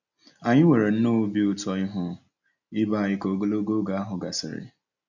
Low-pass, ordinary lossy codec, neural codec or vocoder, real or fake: 7.2 kHz; none; none; real